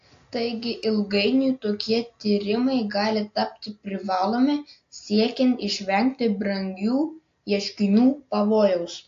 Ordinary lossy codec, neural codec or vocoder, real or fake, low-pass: AAC, 48 kbps; none; real; 7.2 kHz